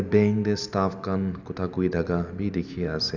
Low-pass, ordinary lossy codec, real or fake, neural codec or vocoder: 7.2 kHz; none; real; none